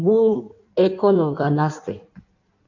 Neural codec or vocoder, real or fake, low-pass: codec, 16 kHz in and 24 kHz out, 1.1 kbps, FireRedTTS-2 codec; fake; 7.2 kHz